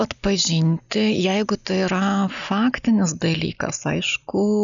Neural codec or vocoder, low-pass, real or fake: none; 7.2 kHz; real